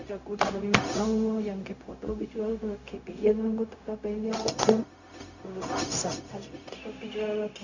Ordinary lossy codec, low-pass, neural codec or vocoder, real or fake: none; 7.2 kHz; codec, 16 kHz, 0.4 kbps, LongCat-Audio-Codec; fake